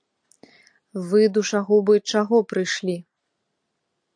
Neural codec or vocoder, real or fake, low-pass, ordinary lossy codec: none; real; 9.9 kHz; AAC, 64 kbps